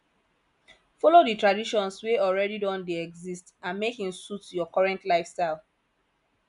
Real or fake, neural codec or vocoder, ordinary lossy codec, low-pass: real; none; MP3, 96 kbps; 10.8 kHz